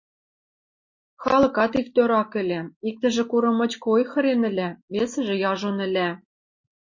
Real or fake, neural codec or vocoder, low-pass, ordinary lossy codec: real; none; 7.2 kHz; MP3, 32 kbps